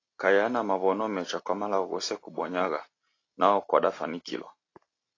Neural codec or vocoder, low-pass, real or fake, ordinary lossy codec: none; 7.2 kHz; real; AAC, 32 kbps